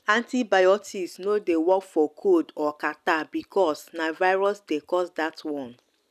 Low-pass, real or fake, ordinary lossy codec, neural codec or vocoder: 14.4 kHz; real; none; none